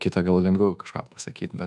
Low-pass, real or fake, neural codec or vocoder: 10.8 kHz; fake; codec, 24 kHz, 1.2 kbps, DualCodec